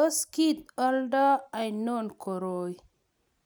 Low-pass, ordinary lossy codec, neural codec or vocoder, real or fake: none; none; none; real